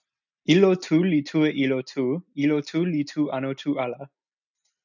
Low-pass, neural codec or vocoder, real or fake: 7.2 kHz; none; real